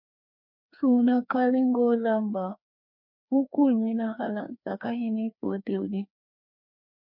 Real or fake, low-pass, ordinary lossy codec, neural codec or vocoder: fake; 5.4 kHz; MP3, 48 kbps; codec, 16 kHz, 2 kbps, FreqCodec, larger model